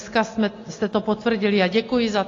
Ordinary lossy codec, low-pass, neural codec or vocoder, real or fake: AAC, 32 kbps; 7.2 kHz; none; real